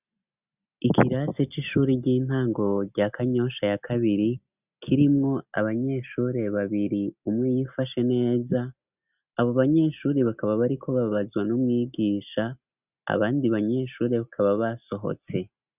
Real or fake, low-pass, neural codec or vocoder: real; 3.6 kHz; none